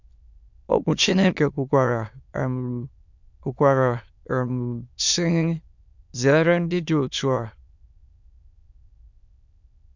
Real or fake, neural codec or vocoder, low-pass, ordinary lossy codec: fake; autoencoder, 22.05 kHz, a latent of 192 numbers a frame, VITS, trained on many speakers; 7.2 kHz; none